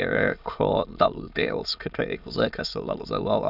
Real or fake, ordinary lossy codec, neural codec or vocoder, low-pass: fake; none; autoencoder, 22.05 kHz, a latent of 192 numbers a frame, VITS, trained on many speakers; 5.4 kHz